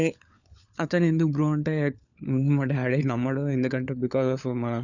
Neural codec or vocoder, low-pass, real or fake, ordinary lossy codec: codec, 16 kHz, 4 kbps, FunCodec, trained on LibriTTS, 50 frames a second; 7.2 kHz; fake; none